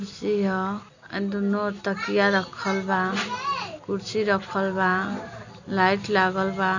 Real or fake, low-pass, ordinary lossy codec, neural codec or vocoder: real; 7.2 kHz; none; none